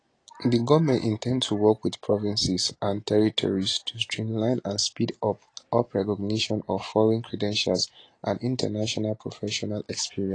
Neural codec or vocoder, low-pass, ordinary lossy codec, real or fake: none; 9.9 kHz; AAC, 32 kbps; real